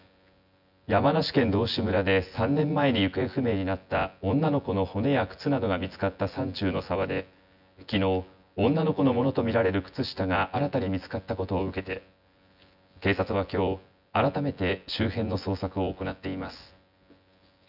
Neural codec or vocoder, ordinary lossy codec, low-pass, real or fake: vocoder, 24 kHz, 100 mel bands, Vocos; none; 5.4 kHz; fake